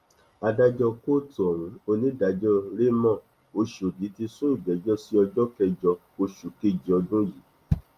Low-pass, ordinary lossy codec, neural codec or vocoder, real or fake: 14.4 kHz; Opus, 32 kbps; none; real